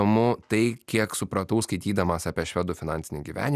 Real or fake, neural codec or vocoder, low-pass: real; none; 14.4 kHz